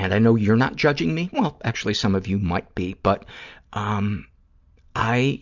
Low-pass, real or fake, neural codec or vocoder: 7.2 kHz; real; none